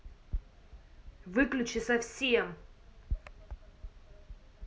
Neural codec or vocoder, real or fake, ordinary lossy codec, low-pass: none; real; none; none